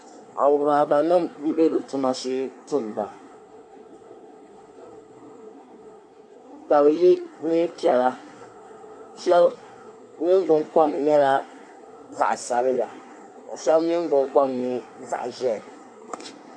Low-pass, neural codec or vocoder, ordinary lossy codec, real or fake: 9.9 kHz; codec, 24 kHz, 1 kbps, SNAC; AAC, 64 kbps; fake